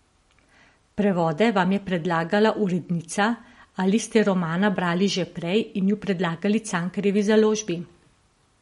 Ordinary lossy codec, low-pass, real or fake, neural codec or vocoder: MP3, 48 kbps; 19.8 kHz; real; none